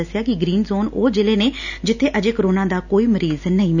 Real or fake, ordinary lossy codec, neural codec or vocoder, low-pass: real; none; none; 7.2 kHz